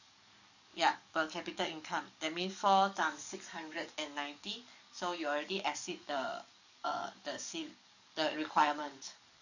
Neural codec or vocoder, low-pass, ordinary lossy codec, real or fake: codec, 44.1 kHz, 7.8 kbps, DAC; 7.2 kHz; none; fake